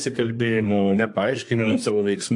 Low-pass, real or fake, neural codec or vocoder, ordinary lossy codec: 10.8 kHz; fake; codec, 24 kHz, 1 kbps, SNAC; AAC, 64 kbps